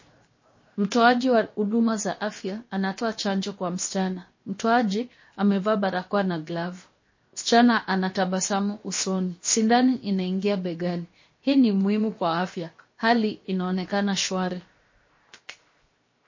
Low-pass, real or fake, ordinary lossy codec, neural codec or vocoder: 7.2 kHz; fake; MP3, 32 kbps; codec, 16 kHz, 0.7 kbps, FocalCodec